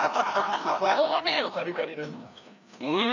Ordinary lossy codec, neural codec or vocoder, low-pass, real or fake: none; codec, 16 kHz, 1 kbps, FreqCodec, larger model; 7.2 kHz; fake